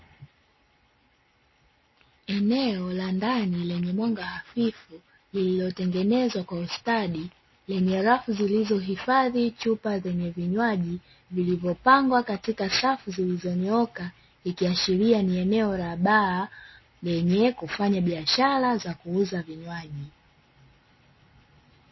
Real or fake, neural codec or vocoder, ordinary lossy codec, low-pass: real; none; MP3, 24 kbps; 7.2 kHz